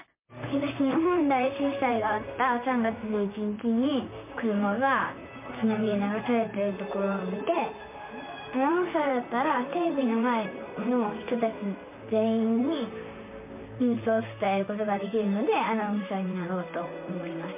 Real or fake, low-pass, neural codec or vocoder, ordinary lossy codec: fake; 3.6 kHz; vocoder, 44.1 kHz, 128 mel bands, Pupu-Vocoder; MP3, 32 kbps